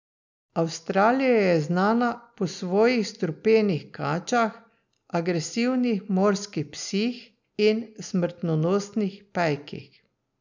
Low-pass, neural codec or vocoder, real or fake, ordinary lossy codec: 7.2 kHz; none; real; none